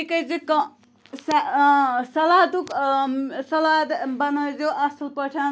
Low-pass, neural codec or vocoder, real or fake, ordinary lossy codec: none; none; real; none